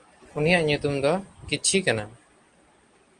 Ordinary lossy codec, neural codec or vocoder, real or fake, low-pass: Opus, 24 kbps; none; real; 9.9 kHz